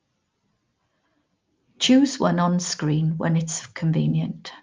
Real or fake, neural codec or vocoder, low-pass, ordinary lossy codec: real; none; 7.2 kHz; Opus, 32 kbps